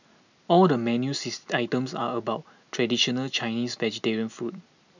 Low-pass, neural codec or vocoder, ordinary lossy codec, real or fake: 7.2 kHz; none; none; real